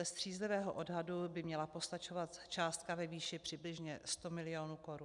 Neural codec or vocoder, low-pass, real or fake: none; 10.8 kHz; real